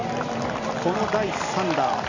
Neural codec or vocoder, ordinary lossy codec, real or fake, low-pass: none; AAC, 48 kbps; real; 7.2 kHz